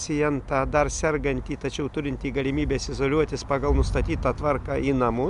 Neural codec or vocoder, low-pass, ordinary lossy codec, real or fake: none; 10.8 kHz; MP3, 96 kbps; real